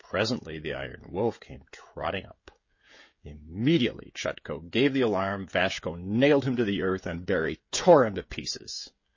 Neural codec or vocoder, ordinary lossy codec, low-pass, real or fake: codec, 16 kHz, 16 kbps, FreqCodec, smaller model; MP3, 32 kbps; 7.2 kHz; fake